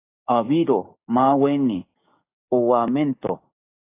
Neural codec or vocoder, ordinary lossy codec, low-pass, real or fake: codec, 44.1 kHz, 7.8 kbps, DAC; AAC, 24 kbps; 3.6 kHz; fake